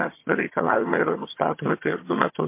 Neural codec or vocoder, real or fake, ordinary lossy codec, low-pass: vocoder, 22.05 kHz, 80 mel bands, HiFi-GAN; fake; MP3, 24 kbps; 3.6 kHz